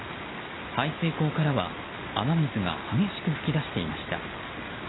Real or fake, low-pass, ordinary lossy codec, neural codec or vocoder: real; 7.2 kHz; AAC, 16 kbps; none